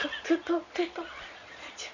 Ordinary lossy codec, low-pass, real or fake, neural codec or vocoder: none; 7.2 kHz; fake; vocoder, 22.05 kHz, 80 mel bands, Vocos